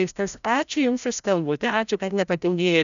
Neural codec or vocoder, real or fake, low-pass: codec, 16 kHz, 0.5 kbps, FreqCodec, larger model; fake; 7.2 kHz